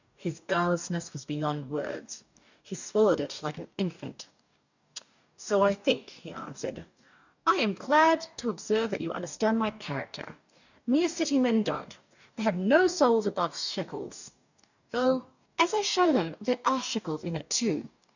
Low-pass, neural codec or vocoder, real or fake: 7.2 kHz; codec, 44.1 kHz, 2.6 kbps, DAC; fake